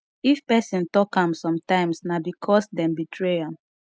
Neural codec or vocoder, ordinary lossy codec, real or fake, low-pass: none; none; real; none